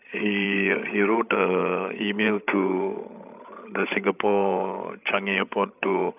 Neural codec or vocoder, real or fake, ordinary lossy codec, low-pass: codec, 16 kHz, 16 kbps, FreqCodec, larger model; fake; none; 3.6 kHz